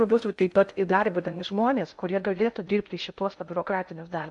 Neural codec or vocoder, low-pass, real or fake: codec, 16 kHz in and 24 kHz out, 0.6 kbps, FocalCodec, streaming, 2048 codes; 10.8 kHz; fake